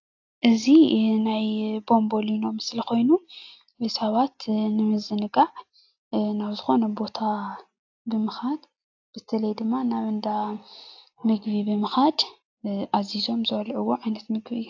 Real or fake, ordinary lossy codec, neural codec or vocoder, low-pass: real; AAC, 48 kbps; none; 7.2 kHz